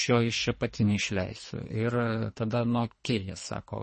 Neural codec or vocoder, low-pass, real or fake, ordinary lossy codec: codec, 24 kHz, 3 kbps, HILCodec; 10.8 kHz; fake; MP3, 32 kbps